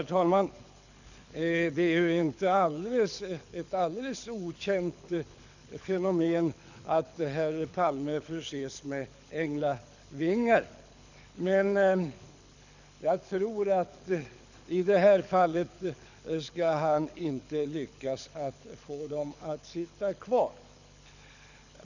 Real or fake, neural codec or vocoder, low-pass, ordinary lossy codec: fake; codec, 24 kHz, 6 kbps, HILCodec; 7.2 kHz; none